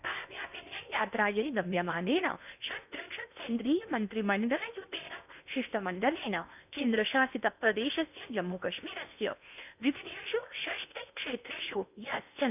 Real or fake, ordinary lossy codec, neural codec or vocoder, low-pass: fake; none; codec, 16 kHz in and 24 kHz out, 0.8 kbps, FocalCodec, streaming, 65536 codes; 3.6 kHz